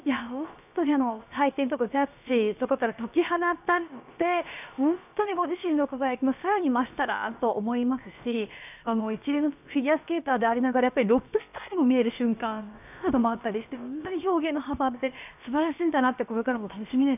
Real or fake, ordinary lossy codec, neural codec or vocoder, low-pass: fake; none; codec, 16 kHz, about 1 kbps, DyCAST, with the encoder's durations; 3.6 kHz